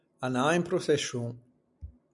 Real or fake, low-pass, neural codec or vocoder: real; 10.8 kHz; none